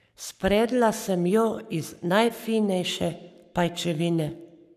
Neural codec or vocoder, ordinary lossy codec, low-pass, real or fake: codec, 44.1 kHz, 7.8 kbps, Pupu-Codec; none; 14.4 kHz; fake